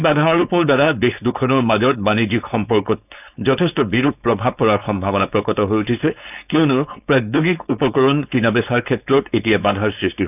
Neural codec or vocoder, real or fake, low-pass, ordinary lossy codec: codec, 16 kHz, 4.8 kbps, FACodec; fake; 3.6 kHz; none